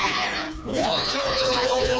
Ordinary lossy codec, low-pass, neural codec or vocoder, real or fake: none; none; codec, 16 kHz, 2 kbps, FreqCodec, smaller model; fake